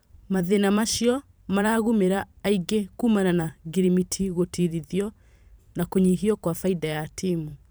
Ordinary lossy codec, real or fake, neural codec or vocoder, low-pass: none; fake; vocoder, 44.1 kHz, 128 mel bands every 512 samples, BigVGAN v2; none